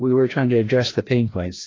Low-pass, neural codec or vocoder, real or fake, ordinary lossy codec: 7.2 kHz; codec, 16 kHz, 1 kbps, FreqCodec, larger model; fake; AAC, 32 kbps